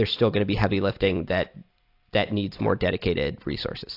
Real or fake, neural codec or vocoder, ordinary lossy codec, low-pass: real; none; AAC, 32 kbps; 5.4 kHz